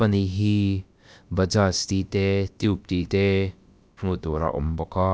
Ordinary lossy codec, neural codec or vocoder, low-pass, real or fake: none; codec, 16 kHz, about 1 kbps, DyCAST, with the encoder's durations; none; fake